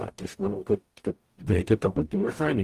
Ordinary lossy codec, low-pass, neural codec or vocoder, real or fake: Opus, 24 kbps; 14.4 kHz; codec, 44.1 kHz, 0.9 kbps, DAC; fake